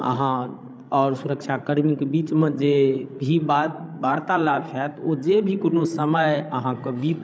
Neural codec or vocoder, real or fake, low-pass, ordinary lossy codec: codec, 16 kHz, 16 kbps, FreqCodec, larger model; fake; none; none